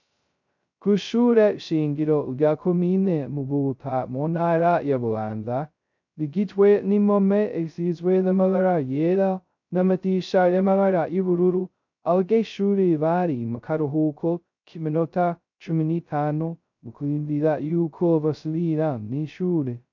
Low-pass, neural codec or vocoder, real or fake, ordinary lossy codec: 7.2 kHz; codec, 16 kHz, 0.2 kbps, FocalCodec; fake; MP3, 64 kbps